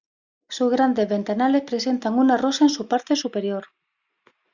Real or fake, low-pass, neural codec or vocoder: real; 7.2 kHz; none